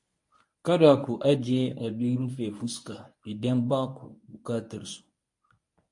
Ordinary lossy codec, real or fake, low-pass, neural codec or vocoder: MP3, 48 kbps; fake; 10.8 kHz; codec, 24 kHz, 0.9 kbps, WavTokenizer, medium speech release version 1